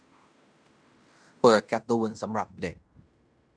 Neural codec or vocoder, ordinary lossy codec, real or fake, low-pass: codec, 16 kHz in and 24 kHz out, 0.9 kbps, LongCat-Audio-Codec, fine tuned four codebook decoder; none; fake; 9.9 kHz